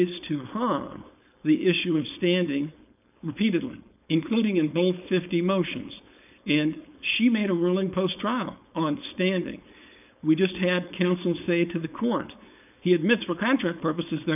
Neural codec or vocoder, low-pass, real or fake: codec, 16 kHz, 4.8 kbps, FACodec; 3.6 kHz; fake